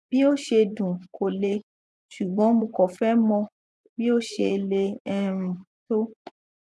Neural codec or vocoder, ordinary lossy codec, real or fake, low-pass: vocoder, 24 kHz, 100 mel bands, Vocos; none; fake; none